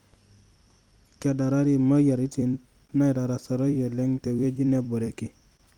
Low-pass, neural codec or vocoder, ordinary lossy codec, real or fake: 19.8 kHz; vocoder, 44.1 kHz, 128 mel bands every 256 samples, BigVGAN v2; Opus, 32 kbps; fake